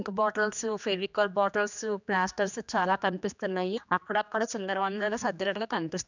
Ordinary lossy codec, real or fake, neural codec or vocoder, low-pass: none; fake; codec, 16 kHz, 2 kbps, X-Codec, HuBERT features, trained on general audio; 7.2 kHz